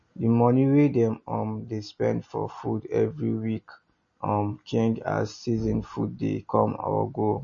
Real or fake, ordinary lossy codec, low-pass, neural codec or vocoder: real; MP3, 32 kbps; 7.2 kHz; none